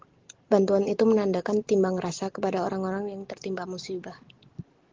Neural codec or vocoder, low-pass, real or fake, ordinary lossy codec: none; 7.2 kHz; real; Opus, 16 kbps